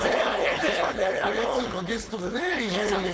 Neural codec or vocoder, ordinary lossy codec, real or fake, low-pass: codec, 16 kHz, 4.8 kbps, FACodec; none; fake; none